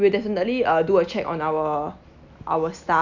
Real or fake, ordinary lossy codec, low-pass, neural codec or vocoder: real; none; 7.2 kHz; none